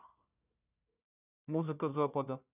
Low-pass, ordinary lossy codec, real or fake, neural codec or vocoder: 3.6 kHz; none; fake; codec, 16 kHz, 1 kbps, FunCodec, trained on Chinese and English, 50 frames a second